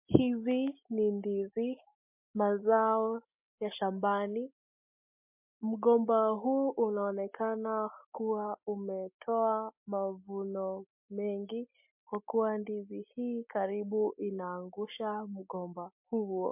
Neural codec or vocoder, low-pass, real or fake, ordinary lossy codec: none; 3.6 kHz; real; MP3, 32 kbps